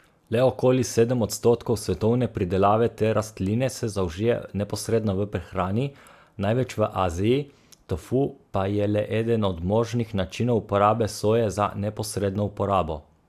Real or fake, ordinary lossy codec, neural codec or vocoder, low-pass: real; AAC, 96 kbps; none; 14.4 kHz